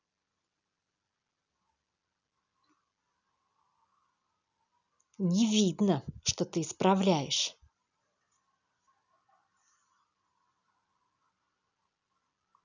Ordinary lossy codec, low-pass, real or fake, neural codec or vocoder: none; 7.2 kHz; real; none